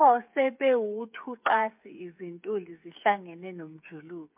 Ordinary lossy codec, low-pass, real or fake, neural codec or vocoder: MP3, 32 kbps; 3.6 kHz; fake; codec, 16 kHz, 8 kbps, FreqCodec, smaller model